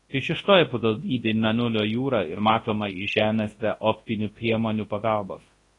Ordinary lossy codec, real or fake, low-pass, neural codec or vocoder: AAC, 32 kbps; fake; 10.8 kHz; codec, 24 kHz, 0.9 kbps, WavTokenizer, large speech release